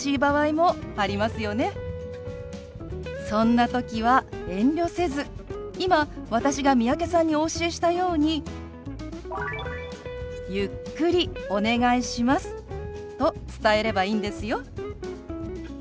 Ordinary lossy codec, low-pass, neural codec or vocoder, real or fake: none; none; none; real